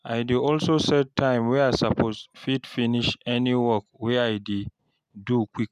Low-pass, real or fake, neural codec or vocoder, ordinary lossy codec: 14.4 kHz; real; none; none